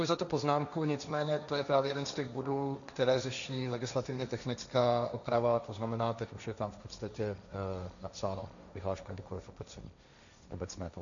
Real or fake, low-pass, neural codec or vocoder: fake; 7.2 kHz; codec, 16 kHz, 1.1 kbps, Voila-Tokenizer